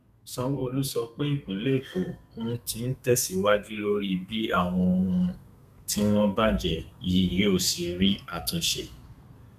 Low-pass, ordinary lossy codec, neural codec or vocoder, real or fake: 14.4 kHz; none; codec, 32 kHz, 1.9 kbps, SNAC; fake